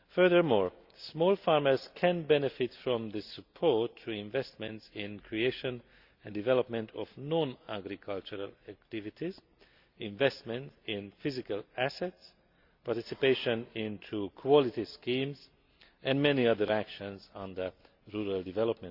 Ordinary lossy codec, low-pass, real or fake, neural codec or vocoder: Opus, 64 kbps; 5.4 kHz; real; none